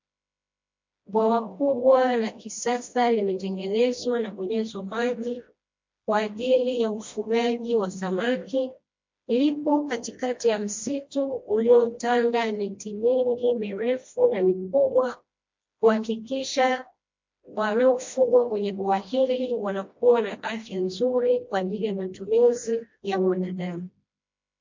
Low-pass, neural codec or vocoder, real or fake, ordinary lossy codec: 7.2 kHz; codec, 16 kHz, 1 kbps, FreqCodec, smaller model; fake; MP3, 48 kbps